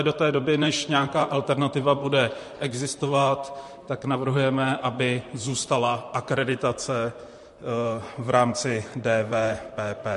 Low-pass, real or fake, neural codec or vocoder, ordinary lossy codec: 14.4 kHz; fake; vocoder, 44.1 kHz, 128 mel bands, Pupu-Vocoder; MP3, 48 kbps